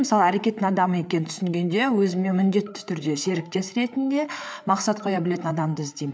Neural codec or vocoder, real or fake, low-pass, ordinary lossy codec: codec, 16 kHz, 8 kbps, FreqCodec, larger model; fake; none; none